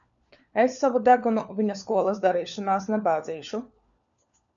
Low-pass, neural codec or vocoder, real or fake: 7.2 kHz; codec, 16 kHz, 4 kbps, FunCodec, trained on LibriTTS, 50 frames a second; fake